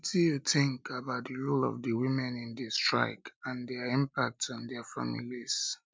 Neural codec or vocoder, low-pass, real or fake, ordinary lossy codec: none; none; real; none